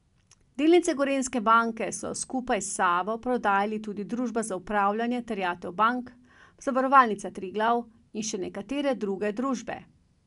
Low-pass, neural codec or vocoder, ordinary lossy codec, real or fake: 10.8 kHz; none; none; real